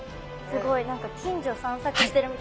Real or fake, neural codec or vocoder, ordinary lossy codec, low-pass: real; none; none; none